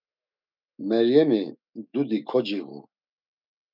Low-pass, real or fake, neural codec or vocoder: 5.4 kHz; fake; autoencoder, 48 kHz, 128 numbers a frame, DAC-VAE, trained on Japanese speech